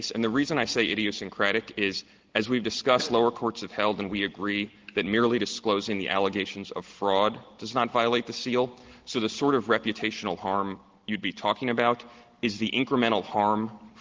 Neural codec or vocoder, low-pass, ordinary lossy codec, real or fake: none; 7.2 kHz; Opus, 16 kbps; real